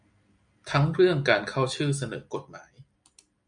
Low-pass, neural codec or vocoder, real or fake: 9.9 kHz; none; real